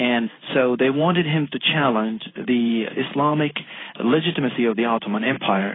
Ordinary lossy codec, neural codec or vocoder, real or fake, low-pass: AAC, 16 kbps; codec, 16 kHz in and 24 kHz out, 1 kbps, XY-Tokenizer; fake; 7.2 kHz